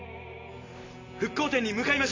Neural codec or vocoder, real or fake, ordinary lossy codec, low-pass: none; real; AAC, 32 kbps; 7.2 kHz